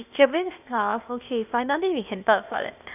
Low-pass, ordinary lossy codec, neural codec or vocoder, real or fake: 3.6 kHz; none; codec, 16 kHz, 0.8 kbps, ZipCodec; fake